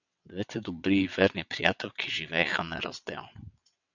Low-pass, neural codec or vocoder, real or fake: 7.2 kHz; vocoder, 22.05 kHz, 80 mel bands, WaveNeXt; fake